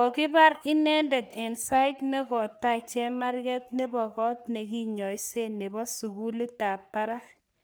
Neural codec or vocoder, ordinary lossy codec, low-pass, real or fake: codec, 44.1 kHz, 3.4 kbps, Pupu-Codec; none; none; fake